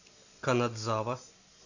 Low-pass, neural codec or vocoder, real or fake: 7.2 kHz; none; real